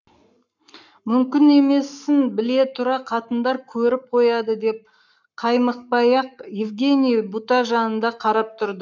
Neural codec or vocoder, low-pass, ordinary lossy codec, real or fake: codec, 44.1 kHz, 7.8 kbps, Pupu-Codec; 7.2 kHz; none; fake